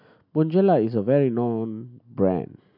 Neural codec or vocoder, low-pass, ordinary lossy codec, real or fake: none; 5.4 kHz; none; real